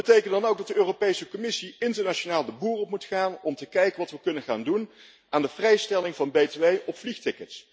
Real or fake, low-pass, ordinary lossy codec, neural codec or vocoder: real; none; none; none